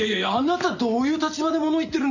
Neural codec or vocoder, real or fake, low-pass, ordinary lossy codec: none; real; 7.2 kHz; none